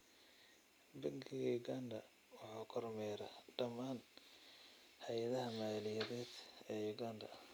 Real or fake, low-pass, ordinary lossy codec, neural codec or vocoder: real; none; none; none